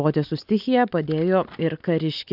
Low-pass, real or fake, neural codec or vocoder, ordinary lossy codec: 5.4 kHz; real; none; AAC, 48 kbps